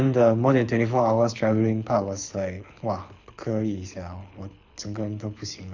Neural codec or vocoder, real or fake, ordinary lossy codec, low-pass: codec, 24 kHz, 6 kbps, HILCodec; fake; none; 7.2 kHz